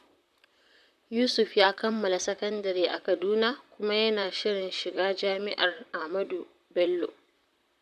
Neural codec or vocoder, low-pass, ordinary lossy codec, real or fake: vocoder, 44.1 kHz, 128 mel bands, Pupu-Vocoder; 14.4 kHz; none; fake